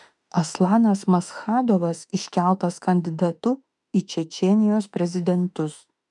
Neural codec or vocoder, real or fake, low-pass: autoencoder, 48 kHz, 32 numbers a frame, DAC-VAE, trained on Japanese speech; fake; 10.8 kHz